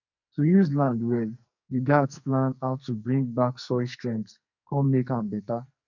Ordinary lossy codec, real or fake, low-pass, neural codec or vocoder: none; fake; 7.2 kHz; codec, 44.1 kHz, 2.6 kbps, SNAC